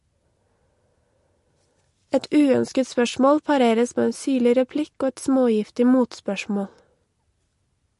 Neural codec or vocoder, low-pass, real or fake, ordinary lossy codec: none; 14.4 kHz; real; MP3, 48 kbps